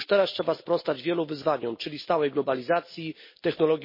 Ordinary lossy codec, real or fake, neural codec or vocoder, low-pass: MP3, 24 kbps; real; none; 5.4 kHz